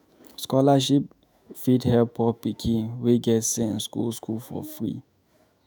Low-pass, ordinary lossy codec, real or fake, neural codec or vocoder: none; none; fake; autoencoder, 48 kHz, 128 numbers a frame, DAC-VAE, trained on Japanese speech